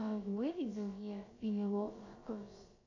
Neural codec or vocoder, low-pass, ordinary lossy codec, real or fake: codec, 16 kHz, about 1 kbps, DyCAST, with the encoder's durations; 7.2 kHz; AAC, 32 kbps; fake